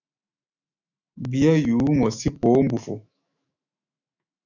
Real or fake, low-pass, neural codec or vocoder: fake; 7.2 kHz; autoencoder, 48 kHz, 128 numbers a frame, DAC-VAE, trained on Japanese speech